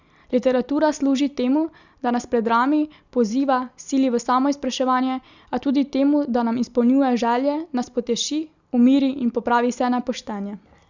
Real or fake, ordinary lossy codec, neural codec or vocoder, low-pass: real; Opus, 64 kbps; none; 7.2 kHz